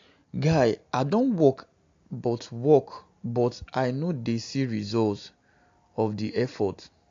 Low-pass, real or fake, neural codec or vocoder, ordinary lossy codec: 7.2 kHz; real; none; AAC, 48 kbps